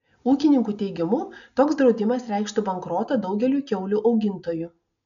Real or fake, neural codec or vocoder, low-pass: real; none; 7.2 kHz